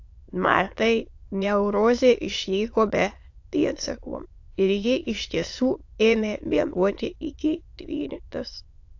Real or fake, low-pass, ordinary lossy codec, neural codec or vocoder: fake; 7.2 kHz; AAC, 48 kbps; autoencoder, 22.05 kHz, a latent of 192 numbers a frame, VITS, trained on many speakers